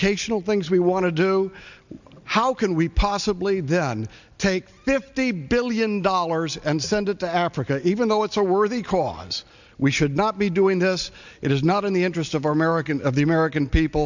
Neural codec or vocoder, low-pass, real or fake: none; 7.2 kHz; real